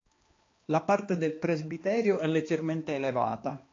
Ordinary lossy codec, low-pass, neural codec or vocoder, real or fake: AAC, 32 kbps; 7.2 kHz; codec, 16 kHz, 2 kbps, X-Codec, HuBERT features, trained on balanced general audio; fake